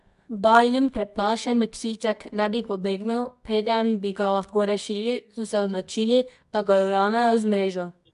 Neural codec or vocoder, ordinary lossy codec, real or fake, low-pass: codec, 24 kHz, 0.9 kbps, WavTokenizer, medium music audio release; AAC, 96 kbps; fake; 10.8 kHz